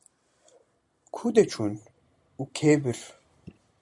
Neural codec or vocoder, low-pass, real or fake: none; 10.8 kHz; real